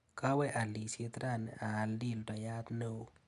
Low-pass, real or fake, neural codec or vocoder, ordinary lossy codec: 10.8 kHz; real; none; none